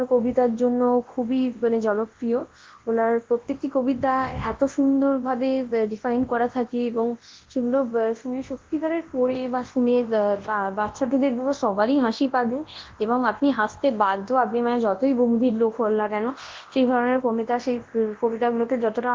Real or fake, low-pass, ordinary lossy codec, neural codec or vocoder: fake; 7.2 kHz; Opus, 16 kbps; codec, 24 kHz, 0.9 kbps, WavTokenizer, large speech release